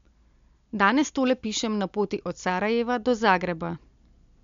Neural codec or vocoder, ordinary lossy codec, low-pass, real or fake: none; MP3, 64 kbps; 7.2 kHz; real